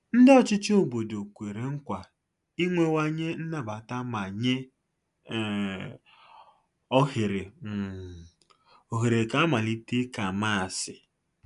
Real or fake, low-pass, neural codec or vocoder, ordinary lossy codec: real; 10.8 kHz; none; none